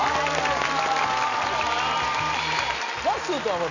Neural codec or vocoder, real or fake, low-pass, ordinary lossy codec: none; real; 7.2 kHz; none